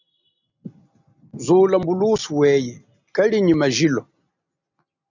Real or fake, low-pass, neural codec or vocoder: real; 7.2 kHz; none